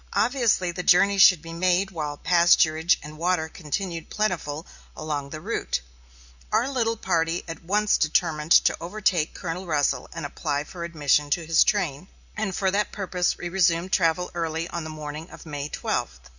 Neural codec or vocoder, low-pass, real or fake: none; 7.2 kHz; real